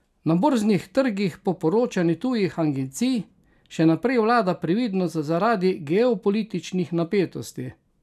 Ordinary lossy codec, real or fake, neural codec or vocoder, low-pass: none; real; none; 14.4 kHz